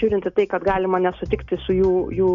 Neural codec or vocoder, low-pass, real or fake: none; 7.2 kHz; real